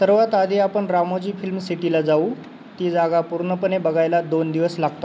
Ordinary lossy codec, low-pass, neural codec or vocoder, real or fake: none; none; none; real